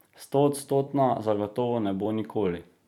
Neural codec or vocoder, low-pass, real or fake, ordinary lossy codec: vocoder, 44.1 kHz, 128 mel bands every 256 samples, BigVGAN v2; 19.8 kHz; fake; none